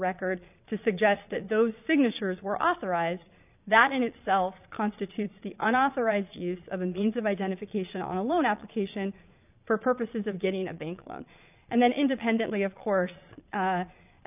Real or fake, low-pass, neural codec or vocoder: fake; 3.6 kHz; vocoder, 22.05 kHz, 80 mel bands, Vocos